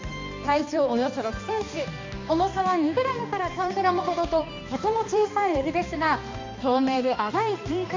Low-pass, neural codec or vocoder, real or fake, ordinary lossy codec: 7.2 kHz; codec, 16 kHz, 2 kbps, X-Codec, HuBERT features, trained on balanced general audio; fake; MP3, 48 kbps